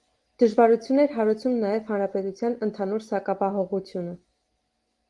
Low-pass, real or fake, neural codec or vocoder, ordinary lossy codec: 10.8 kHz; real; none; Opus, 24 kbps